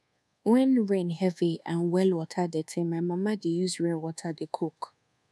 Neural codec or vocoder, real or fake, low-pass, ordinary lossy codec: codec, 24 kHz, 1.2 kbps, DualCodec; fake; none; none